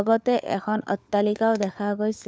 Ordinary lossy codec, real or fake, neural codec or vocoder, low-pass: none; fake; codec, 16 kHz, 4 kbps, FunCodec, trained on LibriTTS, 50 frames a second; none